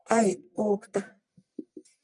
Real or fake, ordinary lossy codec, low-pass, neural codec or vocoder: fake; MP3, 96 kbps; 10.8 kHz; codec, 44.1 kHz, 1.7 kbps, Pupu-Codec